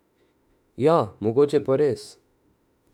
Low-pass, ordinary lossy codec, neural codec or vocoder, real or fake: 19.8 kHz; none; autoencoder, 48 kHz, 32 numbers a frame, DAC-VAE, trained on Japanese speech; fake